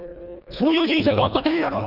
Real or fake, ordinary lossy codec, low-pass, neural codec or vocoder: fake; none; 5.4 kHz; codec, 24 kHz, 1.5 kbps, HILCodec